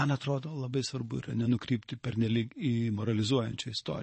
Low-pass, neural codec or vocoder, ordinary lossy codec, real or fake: 9.9 kHz; vocoder, 22.05 kHz, 80 mel bands, Vocos; MP3, 32 kbps; fake